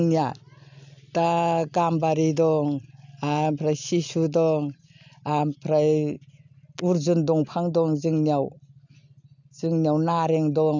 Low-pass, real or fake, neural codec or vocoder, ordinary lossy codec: 7.2 kHz; real; none; none